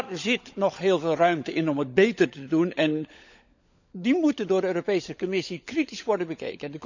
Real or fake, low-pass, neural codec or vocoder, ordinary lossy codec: fake; 7.2 kHz; codec, 16 kHz, 16 kbps, FunCodec, trained on LibriTTS, 50 frames a second; none